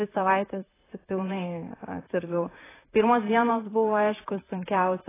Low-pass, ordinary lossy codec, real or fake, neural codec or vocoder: 3.6 kHz; AAC, 16 kbps; fake; vocoder, 44.1 kHz, 128 mel bands, Pupu-Vocoder